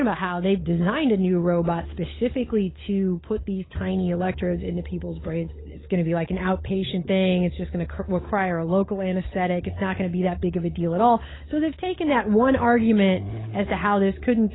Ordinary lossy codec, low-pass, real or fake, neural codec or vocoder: AAC, 16 kbps; 7.2 kHz; fake; codec, 16 kHz, 16 kbps, FunCodec, trained on LibriTTS, 50 frames a second